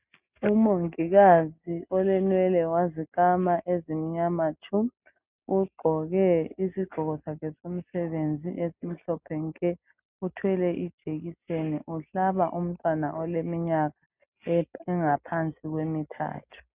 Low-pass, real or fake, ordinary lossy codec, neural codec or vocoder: 3.6 kHz; real; Opus, 64 kbps; none